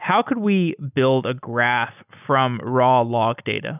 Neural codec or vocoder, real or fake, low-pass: none; real; 3.6 kHz